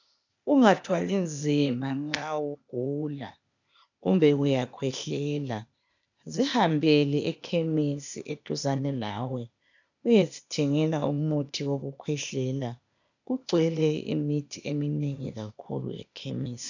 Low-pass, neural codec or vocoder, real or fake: 7.2 kHz; codec, 16 kHz, 0.8 kbps, ZipCodec; fake